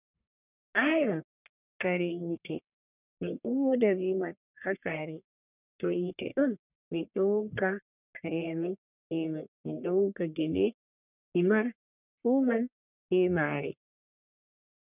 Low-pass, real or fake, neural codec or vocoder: 3.6 kHz; fake; codec, 44.1 kHz, 1.7 kbps, Pupu-Codec